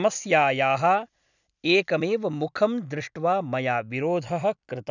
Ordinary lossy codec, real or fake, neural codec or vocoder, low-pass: none; real; none; 7.2 kHz